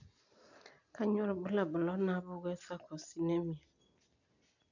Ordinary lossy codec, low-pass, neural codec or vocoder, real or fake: none; 7.2 kHz; none; real